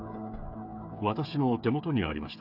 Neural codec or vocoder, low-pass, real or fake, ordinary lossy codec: codec, 16 kHz, 8 kbps, FreqCodec, smaller model; 5.4 kHz; fake; none